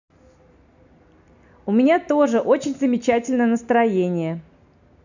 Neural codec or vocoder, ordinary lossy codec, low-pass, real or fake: none; none; 7.2 kHz; real